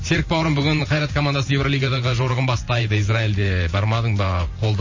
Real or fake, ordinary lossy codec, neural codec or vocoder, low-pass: fake; MP3, 32 kbps; vocoder, 44.1 kHz, 128 mel bands every 512 samples, BigVGAN v2; 7.2 kHz